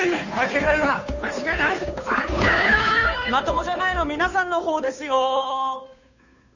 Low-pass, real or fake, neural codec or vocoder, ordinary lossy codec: 7.2 kHz; fake; codec, 16 kHz, 2 kbps, FunCodec, trained on Chinese and English, 25 frames a second; none